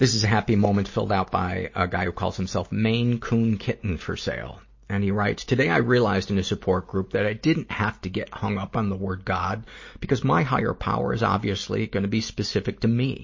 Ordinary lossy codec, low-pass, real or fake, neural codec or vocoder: MP3, 32 kbps; 7.2 kHz; real; none